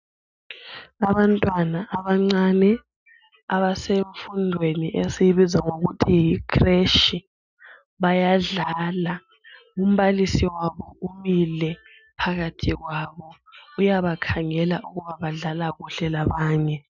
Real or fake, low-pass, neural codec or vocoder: real; 7.2 kHz; none